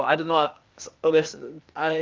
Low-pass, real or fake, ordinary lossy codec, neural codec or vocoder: 7.2 kHz; fake; Opus, 24 kbps; codec, 16 kHz, 0.8 kbps, ZipCodec